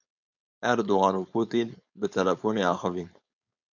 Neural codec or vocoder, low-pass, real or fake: codec, 16 kHz, 4.8 kbps, FACodec; 7.2 kHz; fake